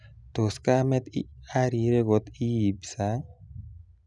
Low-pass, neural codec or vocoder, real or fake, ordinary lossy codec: 10.8 kHz; none; real; none